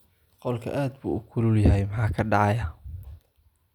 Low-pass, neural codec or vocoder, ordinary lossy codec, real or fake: 19.8 kHz; none; none; real